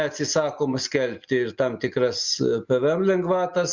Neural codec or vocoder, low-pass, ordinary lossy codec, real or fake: none; 7.2 kHz; Opus, 64 kbps; real